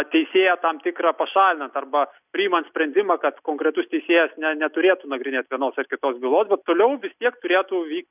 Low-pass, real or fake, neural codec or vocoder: 3.6 kHz; real; none